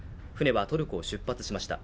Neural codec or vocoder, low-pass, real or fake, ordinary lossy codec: none; none; real; none